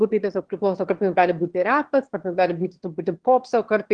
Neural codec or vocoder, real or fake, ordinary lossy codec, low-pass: autoencoder, 22.05 kHz, a latent of 192 numbers a frame, VITS, trained on one speaker; fake; Opus, 16 kbps; 9.9 kHz